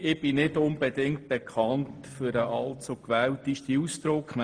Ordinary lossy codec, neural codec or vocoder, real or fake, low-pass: Opus, 24 kbps; none; real; 9.9 kHz